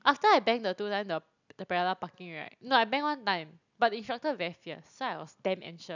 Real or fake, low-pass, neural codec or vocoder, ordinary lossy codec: real; 7.2 kHz; none; none